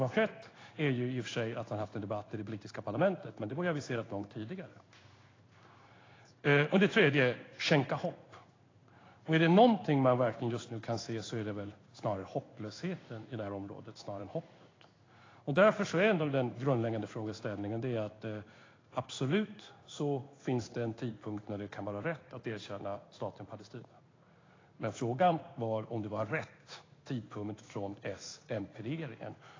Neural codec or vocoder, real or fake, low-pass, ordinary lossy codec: codec, 16 kHz in and 24 kHz out, 1 kbps, XY-Tokenizer; fake; 7.2 kHz; AAC, 32 kbps